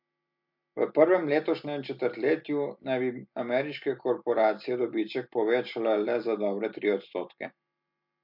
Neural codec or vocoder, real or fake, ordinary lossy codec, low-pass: none; real; MP3, 48 kbps; 5.4 kHz